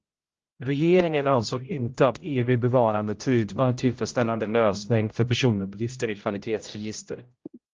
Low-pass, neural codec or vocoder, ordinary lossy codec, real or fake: 7.2 kHz; codec, 16 kHz, 0.5 kbps, X-Codec, HuBERT features, trained on general audio; Opus, 24 kbps; fake